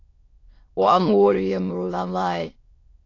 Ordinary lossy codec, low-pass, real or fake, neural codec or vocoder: AAC, 32 kbps; 7.2 kHz; fake; autoencoder, 22.05 kHz, a latent of 192 numbers a frame, VITS, trained on many speakers